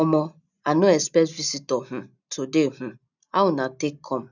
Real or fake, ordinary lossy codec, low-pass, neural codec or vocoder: real; none; 7.2 kHz; none